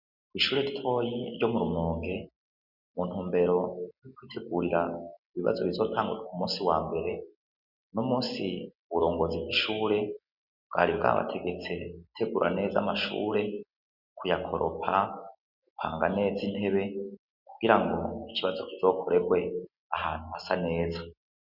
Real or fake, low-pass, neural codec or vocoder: real; 5.4 kHz; none